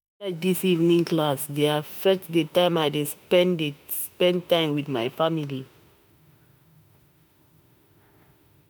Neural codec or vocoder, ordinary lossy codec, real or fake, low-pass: autoencoder, 48 kHz, 32 numbers a frame, DAC-VAE, trained on Japanese speech; none; fake; none